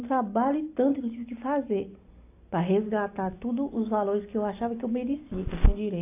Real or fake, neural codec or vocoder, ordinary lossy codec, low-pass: real; none; AAC, 24 kbps; 3.6 kHz